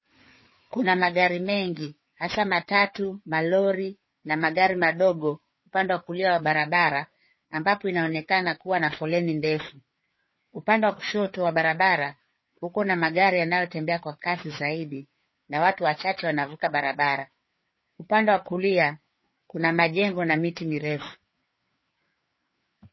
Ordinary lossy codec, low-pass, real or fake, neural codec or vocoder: MP3, 24 kbps; 7.2 kHz; fake; codec, 16 kHz, 4 kbps, FreqCodec, larger model